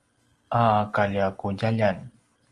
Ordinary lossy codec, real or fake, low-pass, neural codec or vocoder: Opus, 24 kbps; real; 10.8 kHz; none